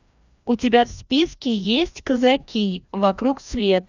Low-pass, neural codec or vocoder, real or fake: 7.2 kHz; codec, 16 kHz, 1 kbps, FreqCodec, larger model; fake